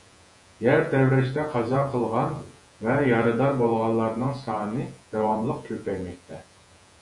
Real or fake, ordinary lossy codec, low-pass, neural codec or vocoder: fake; MP3, 96 kbps; 10.8 kHz; vocoder, 48 kHz, 128 mel bands, Vocos